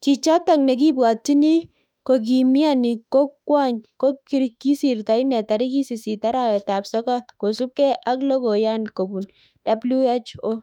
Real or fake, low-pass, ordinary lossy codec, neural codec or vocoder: fake; 19.8 kHz; none; autoencoder, 48 kHz, 32 numbers a frame, DAC-VAE, trained on Japanese speech